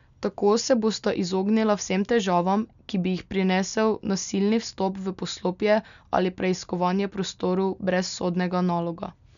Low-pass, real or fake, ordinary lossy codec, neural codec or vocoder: 7.2 kHz; real; none; none